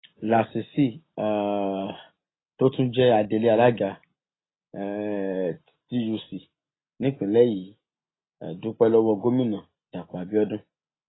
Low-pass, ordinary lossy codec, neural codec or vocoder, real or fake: 7.2 kHz; AAC, 16 kbps; none; real